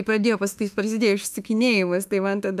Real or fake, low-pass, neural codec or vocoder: fake; 14.4 kHz; autoencoder, 48 kHz, 32 numbers a frame, DAC-VAE, trained on Japanese speech